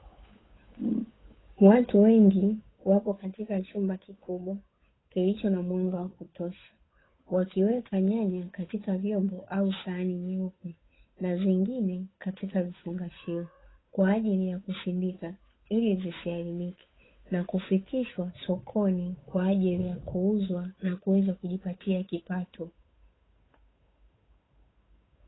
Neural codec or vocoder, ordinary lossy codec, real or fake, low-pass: codec, 16 kHz, 8 kbps, FunCodec, trained on Chinese and English, 25 frames a second; AAC, 16 kbps; fake; 7.2 kHz